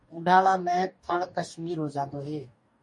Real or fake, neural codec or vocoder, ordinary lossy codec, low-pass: fake; codec, 44.1 kHz, 2.6 kbps, DAC; MP3, 48 kbps; 10.8 kHz